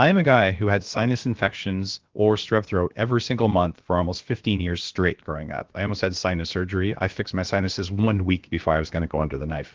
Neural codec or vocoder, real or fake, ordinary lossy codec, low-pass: codec, 16 kHz, about 1 kbps, DyCAST, with the encoder's durations; fake; Opus, 16 kbps; 7.2 kHz